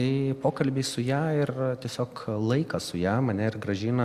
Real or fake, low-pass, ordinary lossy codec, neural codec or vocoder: real; 14.4 kHz; Opus, 64 kbps; none